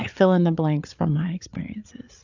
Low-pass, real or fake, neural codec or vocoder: 7.2 kHz; fake; codec, 16 kHz, 8 kbps, FreqCodec, larger model